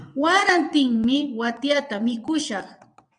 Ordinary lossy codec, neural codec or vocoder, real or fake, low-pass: MP3, 96 kbps; vocoder, 22.05 kHz, 80 mel bands, WaveNeXt; fake; 9.9 kHz